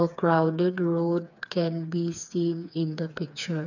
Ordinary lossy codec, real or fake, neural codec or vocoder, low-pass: none; fake; codec, 16 kHz, 4 kbps, FreqCodec, smaller model; 7.2 kHz